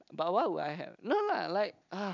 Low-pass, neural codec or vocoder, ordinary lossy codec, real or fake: 7.2 kHz; none; none; real